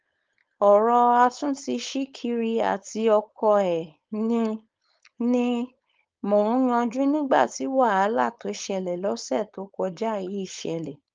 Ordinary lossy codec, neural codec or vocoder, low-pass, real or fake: Opus, 24 kbps; codec, 16 kHz, 4.8 kbps, FACodec; 7.2 kHz; fake